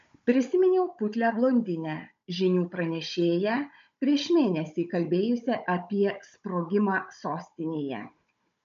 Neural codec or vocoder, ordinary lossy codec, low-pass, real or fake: codec, 16 kHz, 16 kbps, FunCodec, trained on Chinese and English, 50 frames a second; MP3, 48 kbps; 7.2 kHz; fake